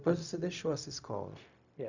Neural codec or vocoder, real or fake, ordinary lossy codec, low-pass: codec, 16 kHz, 0.4 kbps, LongCat-Audio-Codec; fake; none; 7.2 kHz